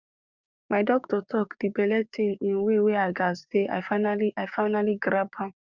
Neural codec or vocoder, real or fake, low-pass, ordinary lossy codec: codec, 16 kHz, 6 kbps, DAC; fake; 7.2 kHz; Opus, 64 kbps